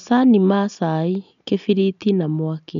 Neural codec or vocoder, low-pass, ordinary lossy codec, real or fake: none; 7.2 kHz; none; real